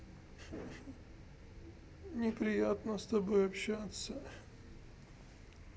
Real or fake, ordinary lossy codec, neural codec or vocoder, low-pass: real; none; none; none